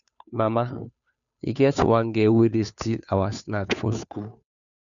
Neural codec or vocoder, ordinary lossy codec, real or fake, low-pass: codec, 16 kHz, 2 kbps, FunCodec, trained on Chinese and English, 25 frames a second; none; fake; 7.2 kHz